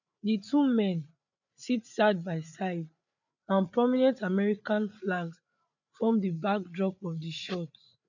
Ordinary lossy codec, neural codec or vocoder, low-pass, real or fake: none; codec, 16 kHz, 8 kbps, FreqCodec, larger model; 7.2 kHz; fake